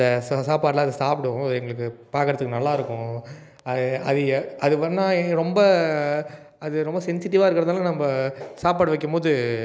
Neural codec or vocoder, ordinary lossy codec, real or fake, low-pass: none; none; real; none